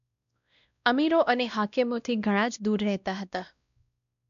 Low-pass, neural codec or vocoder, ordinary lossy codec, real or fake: 7.2 kHz; codec, 16 kHz, 1 kbps, X-Codec, WavLM features, trained on Multilingual LibriSpeech; none; fake